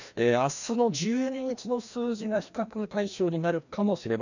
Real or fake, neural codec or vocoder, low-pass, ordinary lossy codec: fake; codec, 16 kHz, 1 kbps, FreqCodec, larger model; 7.2 kHz; none